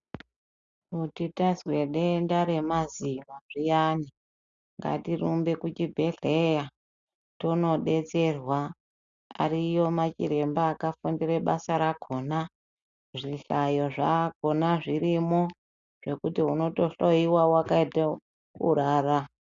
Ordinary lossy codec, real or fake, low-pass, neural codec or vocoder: Opus, 64 kbps; real; 7.2 kHz; none